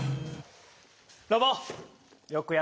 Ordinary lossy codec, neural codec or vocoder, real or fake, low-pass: none; none; real; none